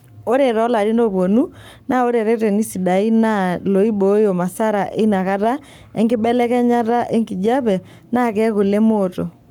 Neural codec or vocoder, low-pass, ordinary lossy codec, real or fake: codec, 44.1 kHz, 7.8 kbps, Pupu-Codec; 19.8 kHz; none; fake